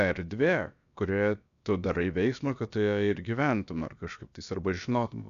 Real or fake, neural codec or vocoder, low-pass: fake; codec, 16 kHz, about 1 kbps, DyCAST, with the encoder's durations; 7.2 kHz